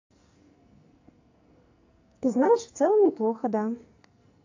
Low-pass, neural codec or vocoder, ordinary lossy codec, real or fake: 7.2 kHz; codec, 44.1 kHz, 2.6 kbps, SNAC; AAC, 48 kbps; fake